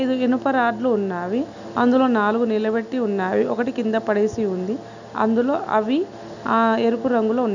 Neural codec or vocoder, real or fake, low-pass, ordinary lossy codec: none; real; 7.2 kHz; none